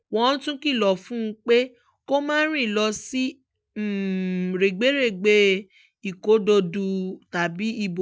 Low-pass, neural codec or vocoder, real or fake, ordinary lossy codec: none; none; real; none